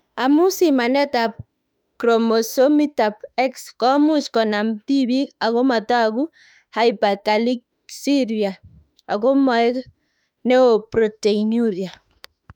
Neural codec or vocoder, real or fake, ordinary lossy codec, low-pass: autoencoder, 48 kHz, 32 numbers a frame, DAC-VAE, trained on Japanese speech; fake; none; 19.8 kHz